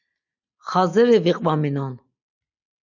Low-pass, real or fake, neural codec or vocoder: 7.2 kHz; real; none